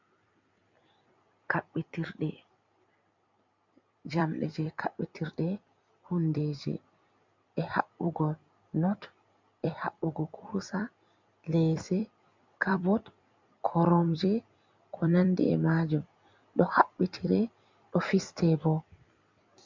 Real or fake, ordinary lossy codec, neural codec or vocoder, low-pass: fake; MP3, 64 kbps; vocoder, 44.1 kHz, 128 mel bands every 256 samples, BigVGAN v2; 7.2 kHz